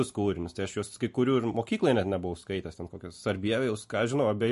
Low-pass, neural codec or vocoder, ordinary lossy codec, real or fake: 14.4 kHz; none; MP3, 48 kbps; real